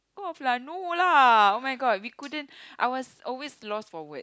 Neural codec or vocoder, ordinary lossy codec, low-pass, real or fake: none; none; none; real